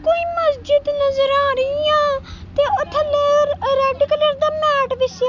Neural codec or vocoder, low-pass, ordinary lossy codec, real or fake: none; 7.2 kHz; none; real